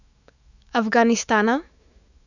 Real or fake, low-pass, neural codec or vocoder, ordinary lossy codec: fake; 7.2 kHz; autoencoder, 48 kHz, 128 numbers a frame, DAC-VAE, trained on Japanese speech; none